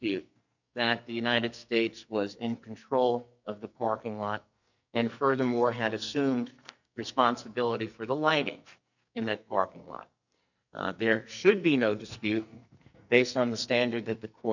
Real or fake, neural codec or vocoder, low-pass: fake; codec, 44.1 kHz, 2.6 kbps, SNAC; 7.2 kHz